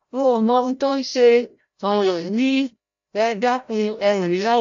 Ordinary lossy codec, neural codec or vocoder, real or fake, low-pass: MP3, 48 kbps; codec, 16 kHz, 0.5 kbps, FreqCodec, larger model; fake; 7.2 kHz